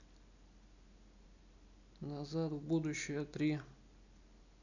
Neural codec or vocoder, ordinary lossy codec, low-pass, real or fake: none; none; 7.2 kHz; real